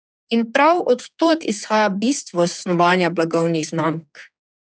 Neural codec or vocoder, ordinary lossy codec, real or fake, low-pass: codec, 16 kHz, 4 kbps, X-Codec, HuBERT features, trained on general audio; none; fake; none